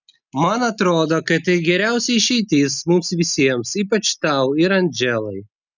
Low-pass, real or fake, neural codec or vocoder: 7.2 kHz; real; none